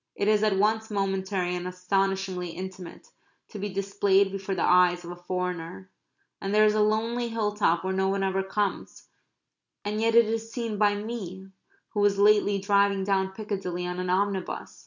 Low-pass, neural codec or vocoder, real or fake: 7.2 kHz; none; real